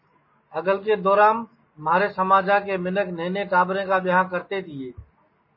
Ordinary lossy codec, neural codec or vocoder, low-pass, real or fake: MP3, 24 kbps; none; 5.4 kHz; real